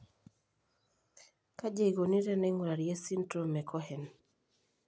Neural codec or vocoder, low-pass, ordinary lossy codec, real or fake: none; none; none; real